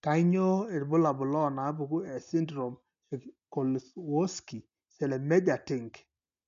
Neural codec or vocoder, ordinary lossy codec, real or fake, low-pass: none; MP3, 64 kbps; real; 7.2 kHz